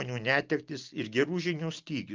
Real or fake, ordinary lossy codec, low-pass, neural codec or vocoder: real; Opus, 32 kbps; 7.2 kHz; none